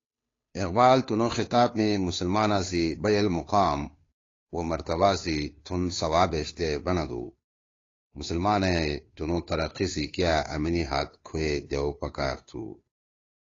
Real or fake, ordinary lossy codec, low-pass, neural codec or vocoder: fake; AAC, 32 kbps; 7.2 kHz; codec, 16 kHz, 2 kbps, FunCodec, trained on Chinese and English, 25 frames a second